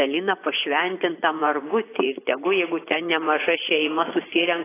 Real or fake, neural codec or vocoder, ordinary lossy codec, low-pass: fake; vocoder, 24 kHz, 100 mel bands, Vocos; AAC, 16 kbps; 3.6 kHz